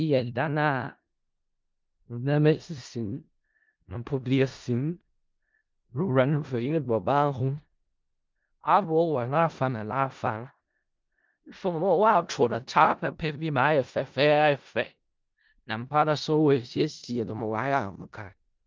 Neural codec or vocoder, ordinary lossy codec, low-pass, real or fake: codec, 16 kHz in and 24 kHz out, 0.4 kbps, LongCat-Audio-Codec, four codebook decoder; Opus, 24 kbps; 7.2 kHz; fake